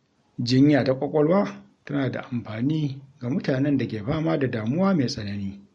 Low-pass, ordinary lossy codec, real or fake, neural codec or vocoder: 19.8 kHz; MP3, 48 kbps; real; none